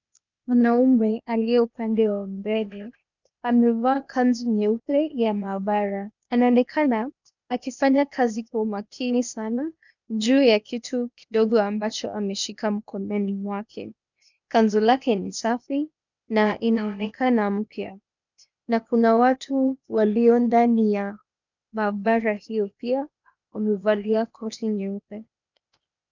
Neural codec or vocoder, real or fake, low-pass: codec, 16 kHz, 0.8 kbps, ZipCodec; fake; 7.2 kHz